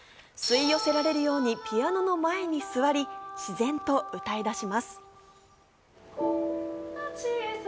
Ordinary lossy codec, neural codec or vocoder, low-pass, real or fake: none; none; none; real